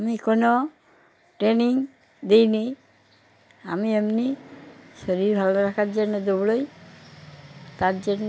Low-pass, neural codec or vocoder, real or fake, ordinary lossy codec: none; none; real; none